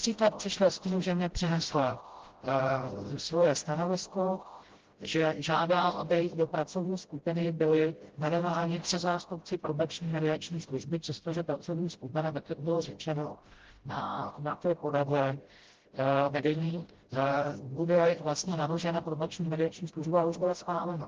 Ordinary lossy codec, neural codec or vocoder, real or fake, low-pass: Opus, 16 kbps; codec, 16 kHz, 0.5 kbps, FreqCodec, smaller model; fake; 7.2 kHz